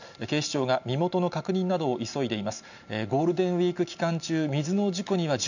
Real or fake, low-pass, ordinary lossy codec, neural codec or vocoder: real; 7.2 kHz; none; none